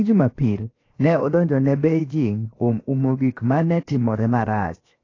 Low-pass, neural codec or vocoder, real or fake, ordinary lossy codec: 7.2 kHz; codec, 16 kHz, 0.7 kbps, FocalCodec; fake; AAC, 32 kbps